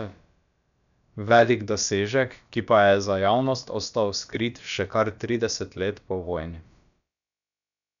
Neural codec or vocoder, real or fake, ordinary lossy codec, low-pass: codec, 16 kHz, about 1 kbps, DyCAST, with the encoder's durations; fake; none; 7.2 kHz